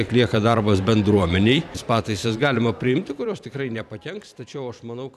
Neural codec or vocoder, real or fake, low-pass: vocoder, 44.1 kHz, 128 mel bands every 512 samples, BigVGAN v2; fake; 14.4 kHz